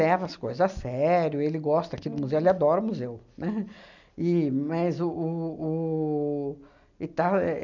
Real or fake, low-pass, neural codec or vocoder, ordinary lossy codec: real; 7.2 kHz; none; none